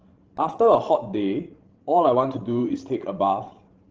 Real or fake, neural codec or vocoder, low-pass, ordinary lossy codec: fake; codec, 16 kHz, 16 kbps, FreqCodec, larger model; 7.2 kHz; Opus, 16 kbps